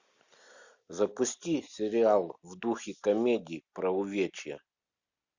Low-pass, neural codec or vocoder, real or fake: 7.2 kHz; none; real